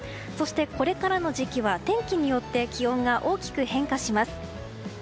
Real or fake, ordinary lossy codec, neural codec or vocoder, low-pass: real; none; none; none